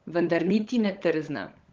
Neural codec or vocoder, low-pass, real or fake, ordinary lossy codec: codec, 16 kHz, 16 kbps, FunCodec, trained on LibriTTS, 50 frames a second; 7.2 kHz; fake; Opus, 16 kbps